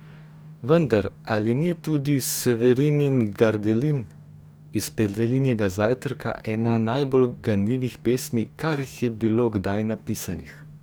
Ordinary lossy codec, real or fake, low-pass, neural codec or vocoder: none; fake; none; codec, 44.1 kHz, 2.6 kbps, DAC